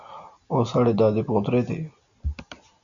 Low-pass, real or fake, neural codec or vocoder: 7.2 kHz; real; none